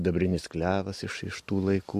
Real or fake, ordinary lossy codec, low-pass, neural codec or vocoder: real; MP3, 64 kbps; 14.4 kHz; none